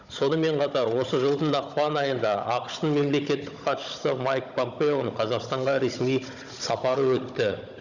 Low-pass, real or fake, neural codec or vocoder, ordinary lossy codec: 7.2 kHz; fake; codec, 16 kHz, 16 kbps, FunCodec, trained on Chinese and English, 50 frames a second; none